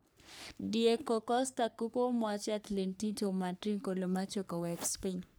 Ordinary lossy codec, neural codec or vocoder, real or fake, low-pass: none; codec, 44.1 kHz, 3.4 kbps, Pupu-Codec; fake; none